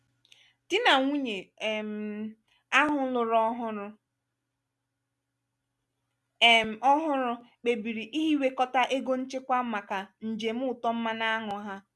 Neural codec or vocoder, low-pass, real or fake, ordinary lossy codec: none; none; real; none